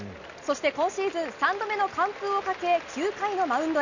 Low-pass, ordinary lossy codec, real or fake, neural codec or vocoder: 7.2 kHz; none; real; none